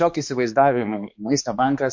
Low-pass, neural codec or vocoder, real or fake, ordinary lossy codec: 7.2 kHz; codec, 16 kHz, 2 kbps, X-Codec, HuBERT features, trained on balanced general audio; fake; MP3, 48 kbps